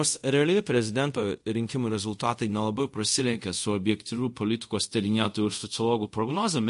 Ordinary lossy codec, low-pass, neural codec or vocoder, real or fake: MP3, 48 kbps; 10.8 kHz; codec, 24 kHz, 0.5 kbps, DualCodec; fake